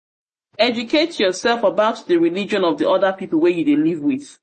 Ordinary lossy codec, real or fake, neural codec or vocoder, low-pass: MP3, 32 kbps; real; none; 10.8 kHz